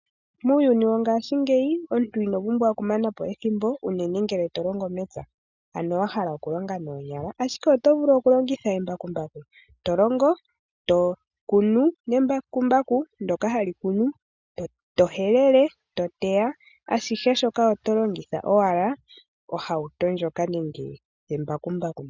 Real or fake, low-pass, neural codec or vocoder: real; 7.2 kHz; none